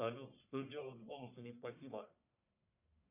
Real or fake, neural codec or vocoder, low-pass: fake; codec, 44.1 kHz, 1.7 kbps, Pupu-Codec; 3.6 kHz